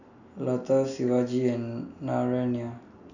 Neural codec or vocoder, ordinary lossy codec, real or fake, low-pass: none; none; real; 7.2 kHz